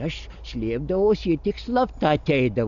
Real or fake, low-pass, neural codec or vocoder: real; 7.2 kHz; none